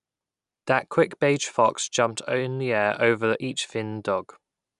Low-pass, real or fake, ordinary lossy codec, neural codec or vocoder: 10.8 kHz; real; none; none